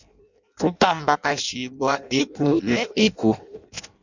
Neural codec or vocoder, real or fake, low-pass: codec, 16 kHz in and 24 kHz out, 0.6 kbps, FireRedTTS-2 codec; fake; 7.2 kHz